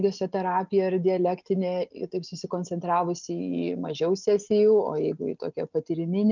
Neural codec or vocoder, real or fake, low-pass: none; real; 7.2 kHz